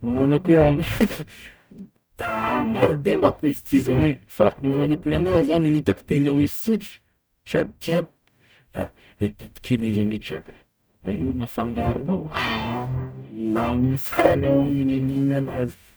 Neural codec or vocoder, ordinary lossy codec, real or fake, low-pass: codec, 44.1 kHz, 0.9 kbps, DAC; none; fake; none